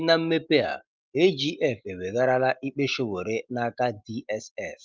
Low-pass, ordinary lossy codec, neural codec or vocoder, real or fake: 7.2 kHz; Opus, 32 kbps; none; real